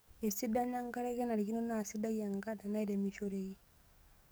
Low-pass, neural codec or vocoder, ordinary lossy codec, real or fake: none; codec, 44.1 kHz, 7.8 kbps, DAC; none; fake